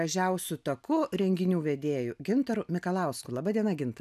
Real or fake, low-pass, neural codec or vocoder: real; 14.4 kHz; none